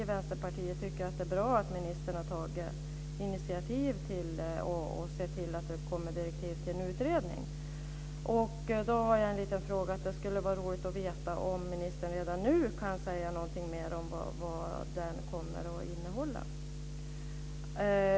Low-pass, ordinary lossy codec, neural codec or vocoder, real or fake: none; none; none; real